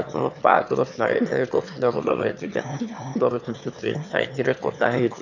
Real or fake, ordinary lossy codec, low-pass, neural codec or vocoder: fake; none; 7.2 kHz; autoencoder, 22.05 kHz, a latent of 192 numbers a frame, VITS, trained on one speaker